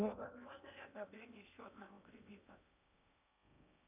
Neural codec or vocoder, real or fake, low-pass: codec, 16 kHz in and 24 kHz out, 0.8 kbps, FocalCodec, streaming, 65536 codes; fake; 3.6 kHz